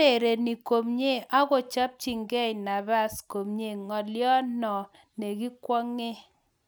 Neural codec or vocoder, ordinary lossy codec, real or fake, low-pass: none; none; real; none